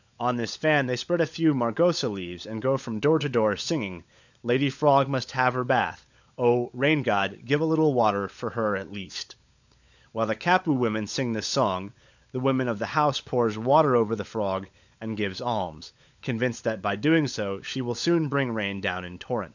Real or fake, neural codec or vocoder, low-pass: fake; codec, 16 kHz, 16 kbps, FunCodec, trained on LibriTTS, 50 frames a second; 7.2 kHz